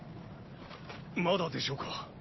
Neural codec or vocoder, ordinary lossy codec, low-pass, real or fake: none; MP3, 24 kbps; 7.2 kHz; real